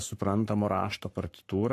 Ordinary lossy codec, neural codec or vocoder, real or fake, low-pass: AAC, 64 kbps; codec, 44.1 kHz, 7.8 kbps, Pupu-Codec; fake; 14.4 kHz